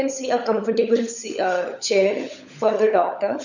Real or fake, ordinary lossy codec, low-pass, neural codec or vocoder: fake; none; 7.2 kHz; codec, 16 kHz, 16 kbps, FunCodec, trained on LibriTTS, 50 frames a second